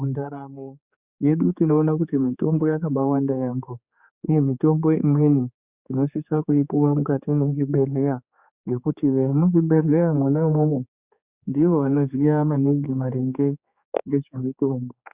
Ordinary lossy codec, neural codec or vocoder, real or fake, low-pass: Opus, 64 kbps; codec, 16 kHz, 4 kbps, X-Codec, HuBERT features, trained on general audio; fake; 3.6 kHz